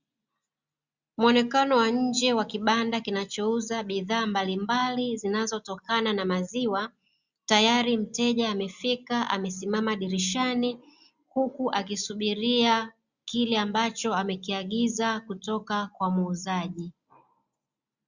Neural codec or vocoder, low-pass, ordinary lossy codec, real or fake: none; 7.2 kHz; Opus, 64 kbps; real